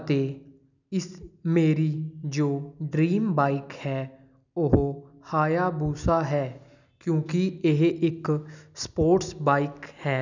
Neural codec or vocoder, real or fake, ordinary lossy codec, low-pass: none; real; none; 7.2 kHz